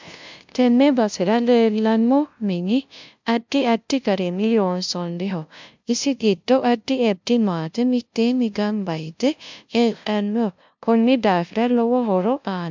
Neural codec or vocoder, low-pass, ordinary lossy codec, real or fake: codec, 16 kHz, 0.5 kbps, FunCodec, trained on LibriTTS, 25 frames a second; 7.2 kHz; MP3, 64 kbps; fake